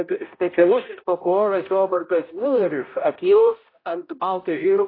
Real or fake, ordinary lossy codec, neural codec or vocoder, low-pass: fake; AAC, 24 kbps; codec, 16 kHz, 0.5 kbps, X-Codec, HuBERT features, trained on balanced general audio; 5.4 kHz